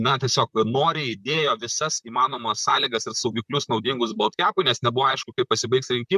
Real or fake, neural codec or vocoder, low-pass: fake; vocoder, 44.1 kHz, 128 mel bands, Pupu-Vocoder; 14.4 kHz